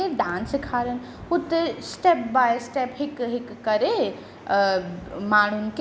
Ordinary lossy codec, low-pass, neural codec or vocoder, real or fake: none; none; none; real